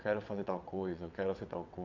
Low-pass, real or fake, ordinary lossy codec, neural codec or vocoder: 7.2 kHz; fake; none; vocoder, 22.05 kHz, 80 mel bands, WaveNeXt